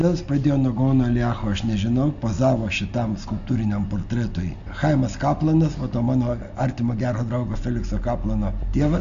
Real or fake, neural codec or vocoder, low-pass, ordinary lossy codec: real; none; 7.2 kHz; AAC, 64 kbps